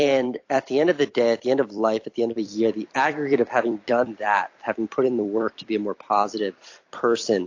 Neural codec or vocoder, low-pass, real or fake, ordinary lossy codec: vocoder, 44.1 kHz, 128 mel bands every 256 samples, BigVGAN v2; 7.2 kHz; fake; AAC, 48 kbps